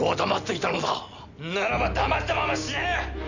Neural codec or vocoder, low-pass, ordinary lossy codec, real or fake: none; 7.2 kHz; none; real